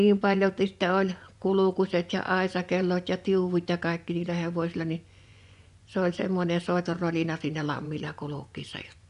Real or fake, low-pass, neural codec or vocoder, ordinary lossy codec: fake; 10.8 kHz; vocoder, 24 kHz, 100 mel bands, Vocos; none